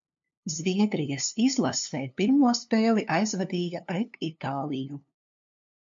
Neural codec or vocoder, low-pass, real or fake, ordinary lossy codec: codec, 16 kHz, 2 kbps, FunCodec, trained on LibriTTS, 25 frames a second; 7.2 kHz; fake; MP3, 48 kbps